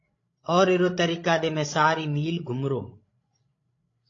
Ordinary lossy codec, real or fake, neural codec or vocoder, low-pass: AAC, 32 kbps; fake; codec, 16 kHz, 16 kbps, FreqCodec, larger model; 7.2 kHz